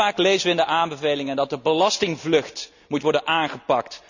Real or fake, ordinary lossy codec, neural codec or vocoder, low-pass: real; none; none; 7.2 kHz